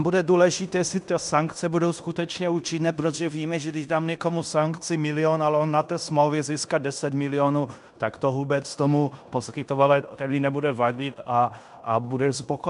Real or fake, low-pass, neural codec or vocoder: fake; 10.8 kHz; codec, 16 kHz in and 24 kHz out, 0.9 kbps, LongCat-Audio-Codec, fine tuned four codebook decoder